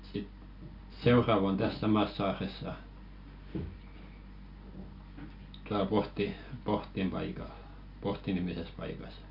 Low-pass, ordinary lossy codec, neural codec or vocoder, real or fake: 5.4 kHz; none; none; real